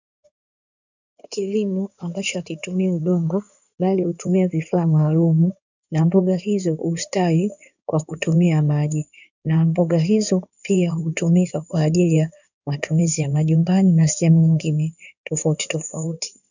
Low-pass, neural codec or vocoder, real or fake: 7.2 kHz; codec, 16 kHz in and 24 kHz out, 2.2 kbps, FireRedTTS-2 codec; fake